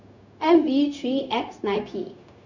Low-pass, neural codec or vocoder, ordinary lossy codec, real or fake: 7.2 kHz; codec, 16 kHz, 0.4 kbps, LongCat-Audio-Codec; none; fake